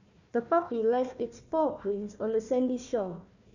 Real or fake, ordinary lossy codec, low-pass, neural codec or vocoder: fake; none; 7.2 kHz; codec, 16 kHz, 1 kbps, FunCodec, trained on Chinese and English, 50 frames a second